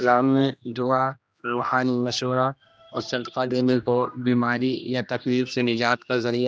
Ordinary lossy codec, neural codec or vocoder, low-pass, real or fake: none; codec, 16 kHz, 1 kbps, X-Codec, HuBERT features, trained on general audio; none; fake